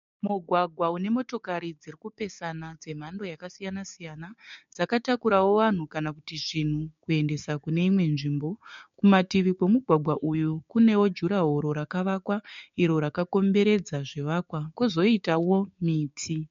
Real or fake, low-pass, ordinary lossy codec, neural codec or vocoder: fake; 7.2 kHz; MP3, 48 kbps; codec, 16 kHz, 6 kbps, DAC